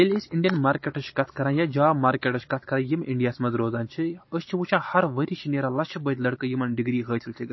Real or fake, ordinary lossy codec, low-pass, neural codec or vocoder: real; MP3, 24 kbps; 7.2 kHz; none